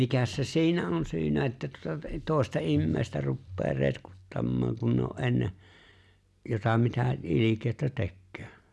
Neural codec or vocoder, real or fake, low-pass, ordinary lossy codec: none; real; none; none